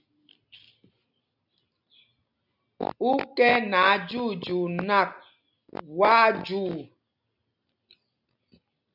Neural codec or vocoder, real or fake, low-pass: none; real; 5.4 kHz